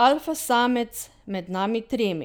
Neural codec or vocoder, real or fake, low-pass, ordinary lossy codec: none; real; none; none